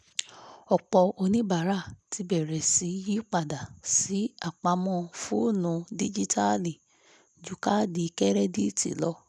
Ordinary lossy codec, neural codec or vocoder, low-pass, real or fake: none; none; none; real